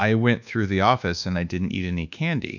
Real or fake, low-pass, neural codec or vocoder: fake; 7.2 kHz; codec, 24 kHz, 1.2 kbps, DualCodec